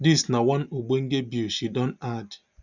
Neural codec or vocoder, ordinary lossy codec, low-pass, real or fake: none; none; 7.2 kHz; real